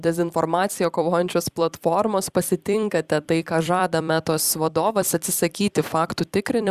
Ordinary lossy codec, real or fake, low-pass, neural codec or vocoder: Opus, 64 kbps; fake; 14.4 kHz; vocoder, 44.1 kHz, 128 mel bands every 256 samples, BigVGAN v2